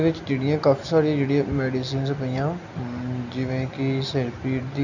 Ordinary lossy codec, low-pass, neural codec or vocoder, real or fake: none; 7.2 kHz; none; real